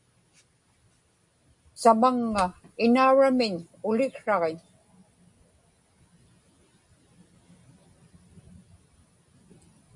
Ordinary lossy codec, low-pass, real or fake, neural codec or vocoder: MP3, 64 kbps; 10.8 kHz; real; none